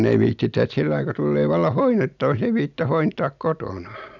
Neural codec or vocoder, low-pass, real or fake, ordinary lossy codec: none; 7.2 kHz; real; none